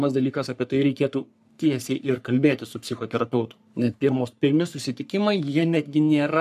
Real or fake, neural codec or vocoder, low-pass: fake; codec, 44.1 kHz, 3.4 kbps, Pupu-Codec; 14.4 kHz